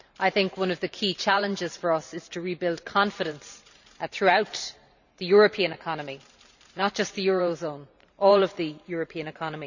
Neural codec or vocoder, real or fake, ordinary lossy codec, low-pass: vocoder, 44.1 kHz, 128 mel bands every 512 samples, BigVGAN v2; fake; none; 7.2 kHz